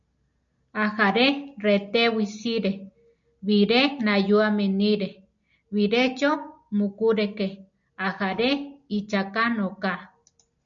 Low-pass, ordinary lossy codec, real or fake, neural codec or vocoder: 7.2 kHz; MP3, 64 kbps; real; none